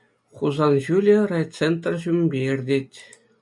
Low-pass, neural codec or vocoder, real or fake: 10.8 kHz; none; real